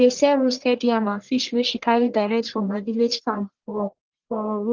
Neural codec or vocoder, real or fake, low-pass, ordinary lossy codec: codec, 44.1 kHz, 1.7 kbps, Pupu-Codec; fake; 7.2 kHz; Opus, 16 kbps